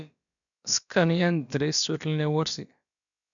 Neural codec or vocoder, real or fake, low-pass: codec, 16 kHz, about 1 kbps, DyCAST, with the encoder's durations; fake; 7.2 kHz